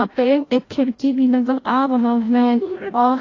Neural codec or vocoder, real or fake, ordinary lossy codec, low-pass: codec, 16 kHz, 0.5 kbps, FreqCodec, larger model; fake; AAC, 32 kbps; 7.2 kHz